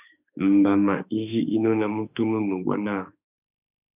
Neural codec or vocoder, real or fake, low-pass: autoencoder, 48 kHz, 32 numbers a frame, DAC-VAE, trained on Japanese speech; fake; 3.6 kHz